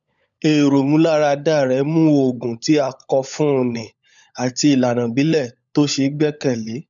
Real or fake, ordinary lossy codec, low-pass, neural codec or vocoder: fake; none; 7.2 kHz; codec, 16 kHz, 16 kbps, FunCodec, trained on LibriTTS, 50 frames a second